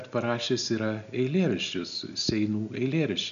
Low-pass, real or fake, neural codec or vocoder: 7.2 kHz; real; none